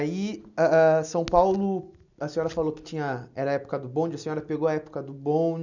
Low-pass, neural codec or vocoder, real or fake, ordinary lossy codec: 7.2 kHz; none; real; none